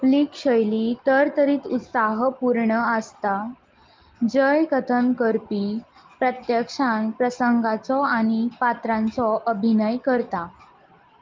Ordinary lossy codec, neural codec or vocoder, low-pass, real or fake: Opus, 24 kbps; none; 7.2 kHz; real